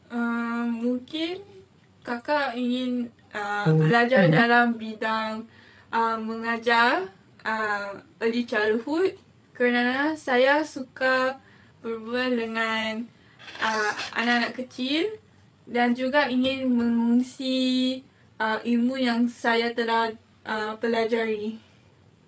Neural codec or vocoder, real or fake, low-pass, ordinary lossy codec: codec, 16 kHz, 8 kbps, FreqCodec, larger model; fake; none; none